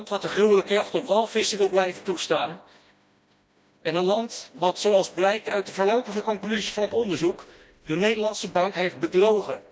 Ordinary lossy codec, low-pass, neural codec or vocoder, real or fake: none; none; codec, 16 kHz, 1 kbps, FreqCodec, smaller model; fake